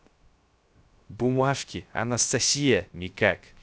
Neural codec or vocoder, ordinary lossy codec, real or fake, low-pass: codec, 16 kHz, 0.3 kbps, FocalCodec; none; fake; none